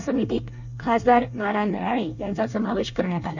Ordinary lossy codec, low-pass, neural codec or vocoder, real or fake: none; 7.2 kHz; codec, 24 kHz, 1 kbps, SNAC; fake